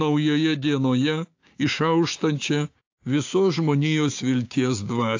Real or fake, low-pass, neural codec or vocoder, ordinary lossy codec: fake; 7.2 kHz; codec, 16 kHz, 6 kbps, DAC; AAC, 48 kbps